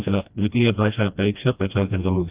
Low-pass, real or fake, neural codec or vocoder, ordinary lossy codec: 3.6 kHz; fake; codec, 16 kHz, 1 kbps, FreqCodec, smaller model; Opus, 24 kbps